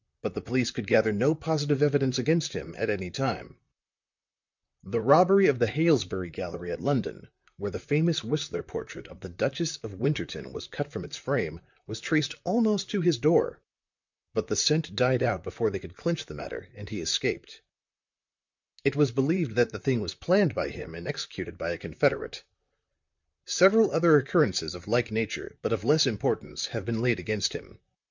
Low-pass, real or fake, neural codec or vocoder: 7.2 kHz; fake; vocoder, 44.1 kHz, 128 mel bands, Pupu-Vocoder